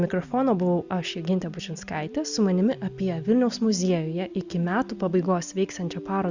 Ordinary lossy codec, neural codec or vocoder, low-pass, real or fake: Opus, 64 kbps; none; 7.2 kHz; real